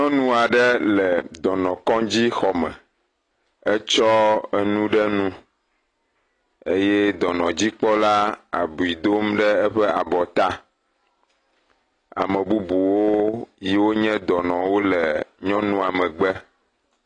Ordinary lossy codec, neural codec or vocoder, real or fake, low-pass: AAC, 32 kbps; none; real; 9.9 kHz